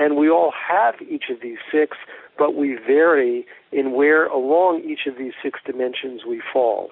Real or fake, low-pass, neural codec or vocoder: real; 5.4 kHz; none